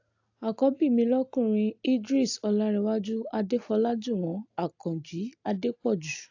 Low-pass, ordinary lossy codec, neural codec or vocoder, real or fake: 7.2 kHz; AAC, 48 kbps; none; real